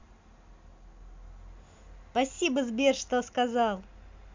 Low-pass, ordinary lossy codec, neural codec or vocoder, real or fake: 7.2 kHz; MP3, 64 kbps; none; real